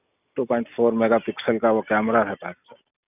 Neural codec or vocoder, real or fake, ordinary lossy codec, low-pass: none; real; none; 3.6 kHz